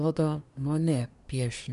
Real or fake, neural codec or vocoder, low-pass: fake; codec, 24 kHz, 1 kbps, SNAC; 10.8 kHz